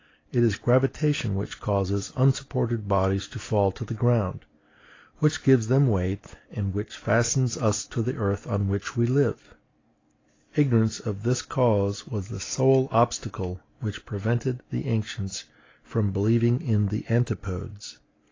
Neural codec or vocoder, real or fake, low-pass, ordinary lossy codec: none; real; 7.2 kHz; AAC, 32 kbps